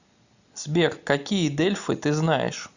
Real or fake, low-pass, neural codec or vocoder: real; 7.2 kHz; none